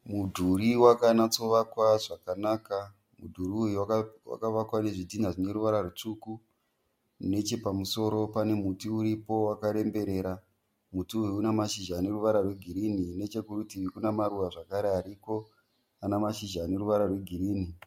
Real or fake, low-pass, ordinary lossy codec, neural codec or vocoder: real; 19.8 kHz; MP3, 64 kbps; none